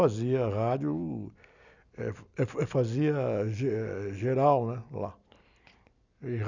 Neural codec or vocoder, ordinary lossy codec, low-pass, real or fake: none; none; 7.2 kHz; real